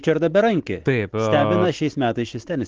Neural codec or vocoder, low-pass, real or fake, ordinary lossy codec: none; 7.2 kHz; real; Opus, 16 kbps